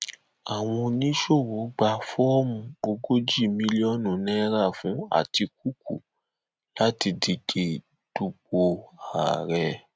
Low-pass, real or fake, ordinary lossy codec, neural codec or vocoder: none; real; none; none